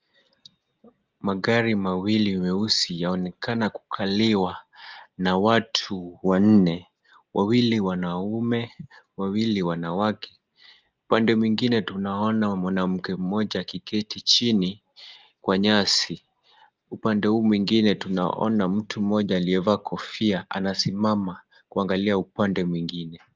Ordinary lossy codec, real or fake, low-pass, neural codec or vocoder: Opus, 24 kbps; real; 7.2 kHz; none